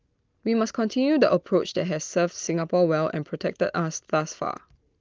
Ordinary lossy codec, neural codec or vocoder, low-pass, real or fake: Opus, 32 kbps; none; 7.2 kHz; real